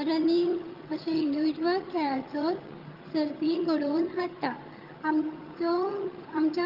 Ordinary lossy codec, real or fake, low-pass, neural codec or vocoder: Opus, 32 kbps; fake; 5.4 kHz; vocoder, 22.05 kHz, 80 mel bands, HiFi-GAN